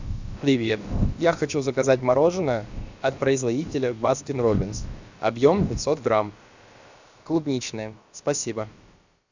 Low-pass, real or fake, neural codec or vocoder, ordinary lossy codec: 7.2 kHz; fake; codec, 16 kHz, about 1 kbps, DyCAST, with the encoder's durations; Opus, 64 kbps